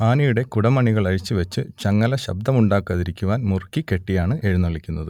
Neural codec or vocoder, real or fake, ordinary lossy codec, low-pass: none; real; none; 19.8 kHz